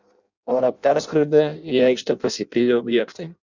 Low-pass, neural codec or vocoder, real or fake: 7.2 kHz; codec, 16 kHz in and 24 kHz out, 0.6 kbps, FireRedTTS-2 codec; fake